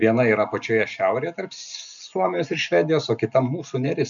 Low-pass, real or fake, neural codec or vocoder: 7.2 kHz; real; none